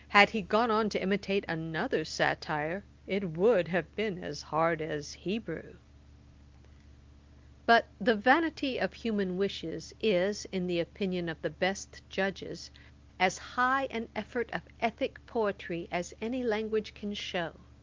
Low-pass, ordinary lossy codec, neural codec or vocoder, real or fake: 7.2 kHz; Opus, 32 kbps; none; real